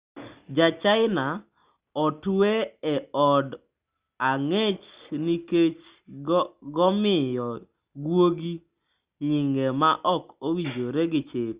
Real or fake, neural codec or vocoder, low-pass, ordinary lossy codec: real; none; 3.6 kHz; Opus, 64 kbps